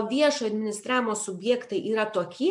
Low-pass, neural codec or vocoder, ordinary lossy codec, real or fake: 10.8 kHz; none; MP3, 64 kbps; real